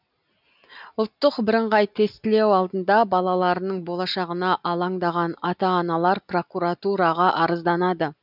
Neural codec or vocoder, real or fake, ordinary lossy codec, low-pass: none; real; none; 5.4 kHz